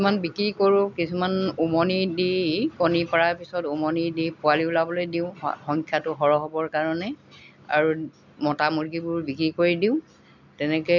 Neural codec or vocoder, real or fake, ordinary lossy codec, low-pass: none; real; none; 7.2 kHz